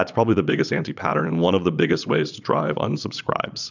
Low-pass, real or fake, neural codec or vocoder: 7.2 kHz; fake; vocoder, 22.05 kHz, 80 mel bands, Vocos